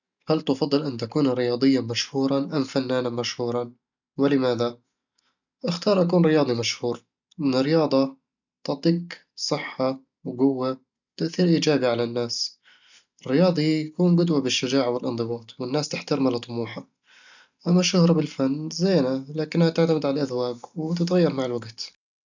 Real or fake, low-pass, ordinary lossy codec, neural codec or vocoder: real; 7.2 kHz; none; none